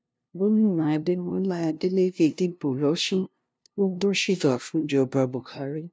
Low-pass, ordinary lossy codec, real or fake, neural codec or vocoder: none; none; fake; codec, 16 kHz, 0.5 kbps, FunCodec, trained on LibriTTS, 25 frames a second